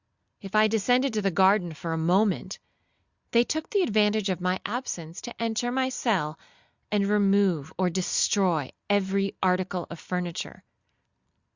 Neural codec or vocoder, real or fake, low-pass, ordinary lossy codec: none; real; 7.2 kHz; Opus, 64 kbps